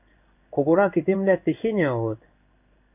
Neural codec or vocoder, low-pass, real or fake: codec, 16 kHz in and 24 kHz out, 1 kbps, XY-Tokenizer; 3.6 kHz; fake